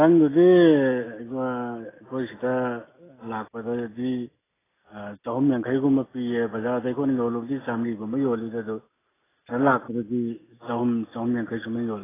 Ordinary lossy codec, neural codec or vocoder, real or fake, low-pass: AAC, 16 kbps; none; real; 3.6 kHz